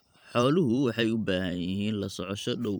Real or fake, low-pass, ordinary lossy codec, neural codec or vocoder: fake; none; none; vocoder, 44.1 kHz, 128 mel bands every 512 samples, BigVGAN v2